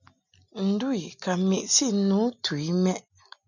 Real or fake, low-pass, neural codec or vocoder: real; 7.2 kHz; none